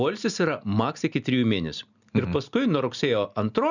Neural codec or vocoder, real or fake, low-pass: none; real; 7.2 kHz